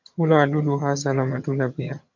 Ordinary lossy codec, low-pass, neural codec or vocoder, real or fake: MP3, 64 kbps; 7.2 kHz; vocoder, 22.05 kHz, 80 mel bands, HiFi-GAN; fake